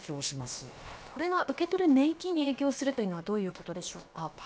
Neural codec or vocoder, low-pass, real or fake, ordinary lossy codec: codec, 16 kHz, about 1 kbps, DyCAST, with the encoder's durations; none; fake; none